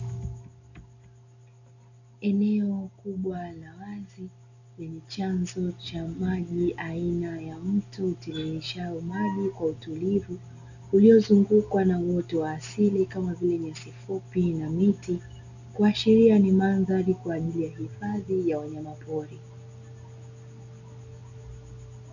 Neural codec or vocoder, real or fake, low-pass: none; real; 7.2 kHz